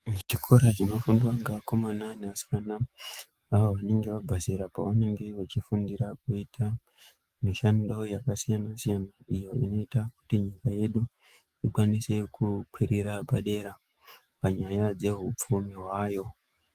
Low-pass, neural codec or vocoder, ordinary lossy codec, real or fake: 14.4 kHz; autoencoder, 48 kHz, 128 numbers a frame, DAC-VAE, trained on Japanese speech; Opus, 32 kbps; fake